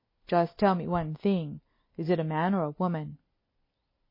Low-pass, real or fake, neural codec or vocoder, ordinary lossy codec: 5.4 kHz; real; none; MP3, 24 kbps